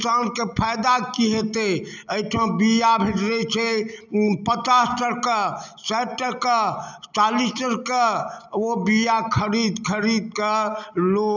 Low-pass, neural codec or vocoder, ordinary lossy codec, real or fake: 7.2 kHz; none; none; real